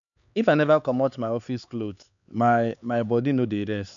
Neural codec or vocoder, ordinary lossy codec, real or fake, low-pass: codec, 16 kHz, 4 kbps, X-Codec, HuBERT features, trained on LibriSpeech; none; fake; 7.2 kHz